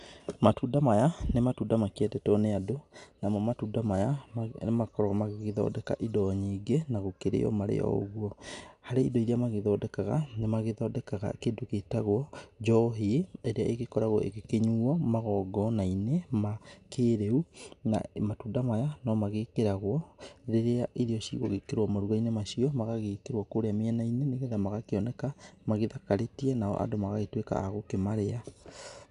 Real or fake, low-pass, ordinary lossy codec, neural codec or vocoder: real; 10.8 kHz; none; none